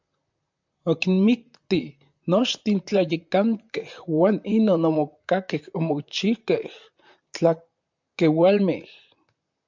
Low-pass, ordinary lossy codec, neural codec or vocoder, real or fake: 7.2 kHz; MP3, 64 kbps; vocoder, 44.1 kHz, 128 mel bands, Pupu-Vocoder; fake